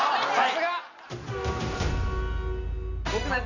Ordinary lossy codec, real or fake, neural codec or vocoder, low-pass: none; real; none; 7.2 kHz